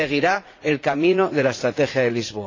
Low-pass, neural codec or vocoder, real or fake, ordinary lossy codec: 7.2 kHz; vocoder, 44.1 kHz, 80 mel bands, Vocos; fake; AAC, 32 kbps